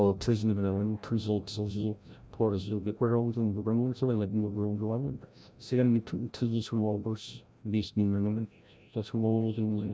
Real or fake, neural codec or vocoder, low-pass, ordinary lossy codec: fake; codec, 16 kHz, 0.5 kbps, FreqCodec, larger model; none; none